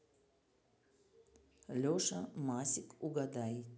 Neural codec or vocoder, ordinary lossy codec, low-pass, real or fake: none; none; none; real